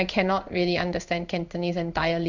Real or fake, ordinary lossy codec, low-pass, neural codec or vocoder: fake; none; 7.2 kHz; codec, 16 kHz in and 24 kHz out, 1 kbps, XY-Tokenizer